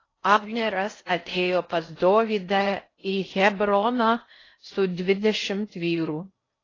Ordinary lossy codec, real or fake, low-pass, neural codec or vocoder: AAC, 32 kbps; fake; 7.2 kHz; codec, 16 kHz in and 24 kHz out, 0.6 kbps, FocalCodec, streaming, 4096 codes